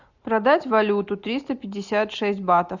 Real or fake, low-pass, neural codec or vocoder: real; 7.2 kHz; none